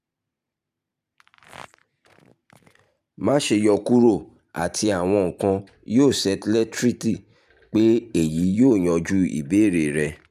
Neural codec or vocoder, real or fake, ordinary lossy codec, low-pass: vocoder, 44.1 kHz, 128 mel bands every 512 samples, BigVGAN v2; fake; none; 14.4 kHz